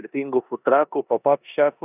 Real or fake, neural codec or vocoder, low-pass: fake; codec, 16 kHz in and 24 kHz out, 0.9 kbps, LongCat-Audio-Codec, four codebook decoder; 3.6 kHz